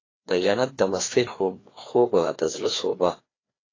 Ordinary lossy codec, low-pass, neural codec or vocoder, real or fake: AAC, 32 kbps; 7.2 kHz; codec, 16 kHz, 2 kbps, FreqCodec, larger model; fake